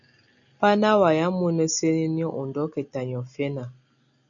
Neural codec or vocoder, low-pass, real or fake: none; 7.2 kHz; real